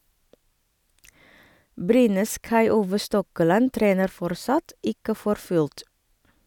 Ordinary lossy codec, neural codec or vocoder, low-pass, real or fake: none; none; 19.8 kHz; real